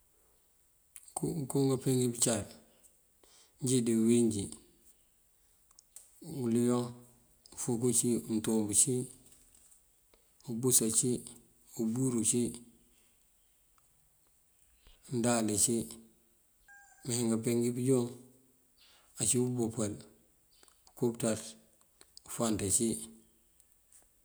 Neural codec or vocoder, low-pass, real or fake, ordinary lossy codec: none; none; real; none